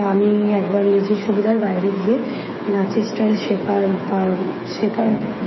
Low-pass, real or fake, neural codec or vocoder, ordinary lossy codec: 7.2 kHz; fake; codec, 16 kHz, 8 kbps, FreqCodec, smaller model; MP3, 24 kbps